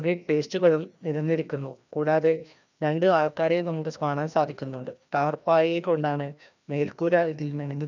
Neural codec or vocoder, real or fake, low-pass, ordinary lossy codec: codec, 16 kHz, 1 kbps, FreqCodec, larger model; fake; 7.2 kHz; none